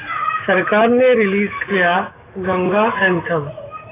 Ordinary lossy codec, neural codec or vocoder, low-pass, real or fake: AAC, 16 kbps; autoencoder, 48 kHz, 128 numbers a frame, DAC-VAE, trained on Japanese speech; 3.6 kHz; fake